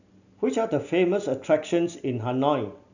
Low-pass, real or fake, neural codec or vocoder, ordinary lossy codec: 7.2 kHz; real; none; none